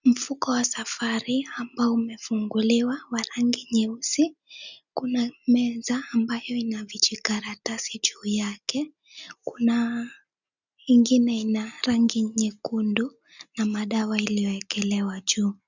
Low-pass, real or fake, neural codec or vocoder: 7.2 kHz; real; none